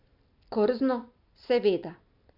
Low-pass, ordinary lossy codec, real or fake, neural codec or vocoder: 5.4 kHz; MP3, 48 kbps; real; none